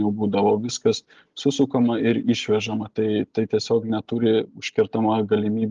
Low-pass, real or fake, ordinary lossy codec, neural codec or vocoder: 7.2 kHz; real; Opus, 24 kbps; none